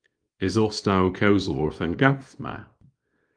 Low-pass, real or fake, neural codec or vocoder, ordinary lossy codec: 9.9 kHz; fake; codec, 24 kHz, 0.9 kbps, WavTokenizer, small release; Opus, 32 kbps